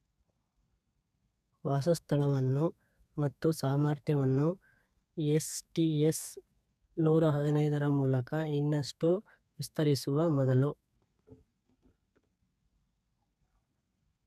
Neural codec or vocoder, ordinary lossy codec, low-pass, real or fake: codec, 32 kHz, 1.9 kbps, SNAC; none; 14.4 kHz; fake